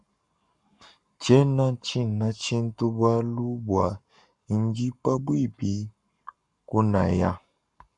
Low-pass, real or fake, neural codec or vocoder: 10.8 kHz; fake; codec, 44.1 kHz, 7.8 kbps, Pupu-Codec